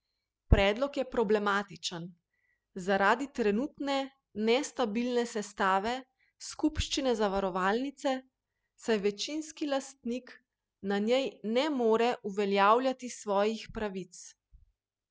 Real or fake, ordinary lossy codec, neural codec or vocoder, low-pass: real; none; none; none